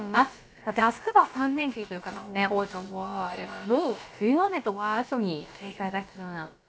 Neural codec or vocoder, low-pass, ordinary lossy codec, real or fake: codec, 16 kHz, about 1 kbps, DyCAST, with the encoder's durations; none; none; fake